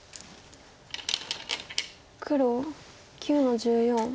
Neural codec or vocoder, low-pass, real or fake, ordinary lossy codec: none; none; real; none